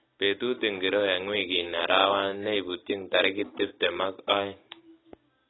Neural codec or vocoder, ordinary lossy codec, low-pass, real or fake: none; AAC, 16 kbps; 7.2 kHz; real